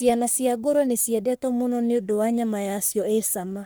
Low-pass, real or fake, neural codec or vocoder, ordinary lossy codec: none; fake; codec, 44.1 kHz, 3.4 kbps, Pupu-Codec; none